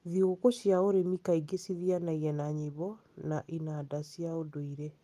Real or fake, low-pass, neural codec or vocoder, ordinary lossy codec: real; 14.4 kHz; none; Opus, 32 kbps